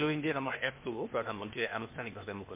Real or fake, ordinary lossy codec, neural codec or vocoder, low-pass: fake; MP3, 32 kbps; codec, 16 kHz, 0.8 kbps, ZipCodec; 3.6 kHz